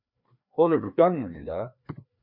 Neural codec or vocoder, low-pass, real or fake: codec, 16 kHz, 2 kbps, FreqCodec, larger model; 5.4 kHz; fake